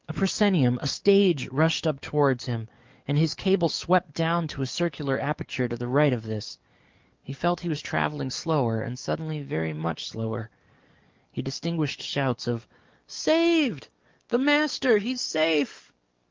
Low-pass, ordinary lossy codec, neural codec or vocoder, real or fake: 7.2 kHz; Opus, 32 kbps; codec, 44.1 kHz, 7.8 kbps, DAC; fake